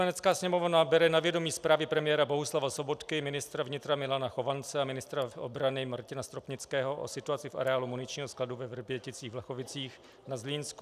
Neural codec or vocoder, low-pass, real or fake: none; 14.4 kHz; real